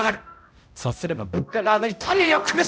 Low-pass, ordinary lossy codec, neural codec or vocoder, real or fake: none; none; codec, 16 kHz, 0.5 kbps, X-Codec, HuBERT features, trained on balanced general audio; fake